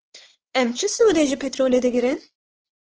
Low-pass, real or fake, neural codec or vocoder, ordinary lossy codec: 7.2 kHz; fake; codec, 16 kHz, 4 kbps, X-Codec, WavLM features, trained on Multilingual LibriSpeech; Opus, 16 kbps